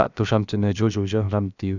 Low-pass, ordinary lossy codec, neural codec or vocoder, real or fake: 7.2 kHz; none; codec, 16 kHz, about 1 kbps, DyCAST, with the encoder's durations; fake